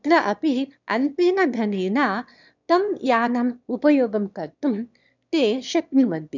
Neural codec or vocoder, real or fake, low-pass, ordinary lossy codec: autoencoder, 22.05 kHz, a latent of 192 numbers a frame, VITS, trained on one speaker; fake; 7.2 kHz; none